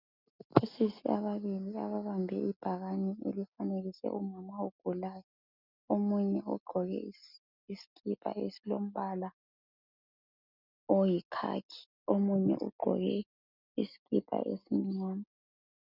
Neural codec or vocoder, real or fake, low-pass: none; real; 5.4 kHz